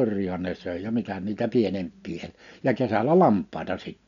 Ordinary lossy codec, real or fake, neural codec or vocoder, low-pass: none; real; none; 7.2 kHz